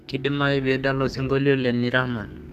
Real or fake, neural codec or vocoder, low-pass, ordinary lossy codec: fake; codec, 32 kHz, 1.9 kbps, SNAC; 14.4 kHz; none